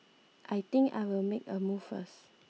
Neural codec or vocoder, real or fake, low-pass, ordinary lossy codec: none; real; none; none